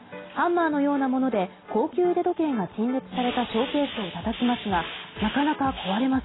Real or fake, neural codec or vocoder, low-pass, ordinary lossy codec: real; none; 7.2 kHz; AAC, 16 kbps